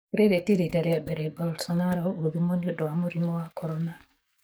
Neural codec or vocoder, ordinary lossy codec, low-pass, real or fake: codec, 44.1 kHz, 7.8 kbps, DAC; none; none; fake